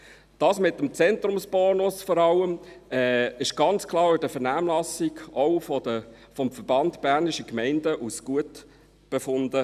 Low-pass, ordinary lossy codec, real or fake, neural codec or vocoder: 14.4 kHz; none; real; none